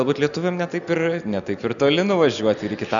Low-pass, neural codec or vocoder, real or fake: 7.2 kHz; none; real